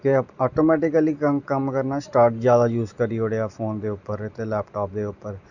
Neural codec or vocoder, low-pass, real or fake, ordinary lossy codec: none; 7.2 kHz; real; none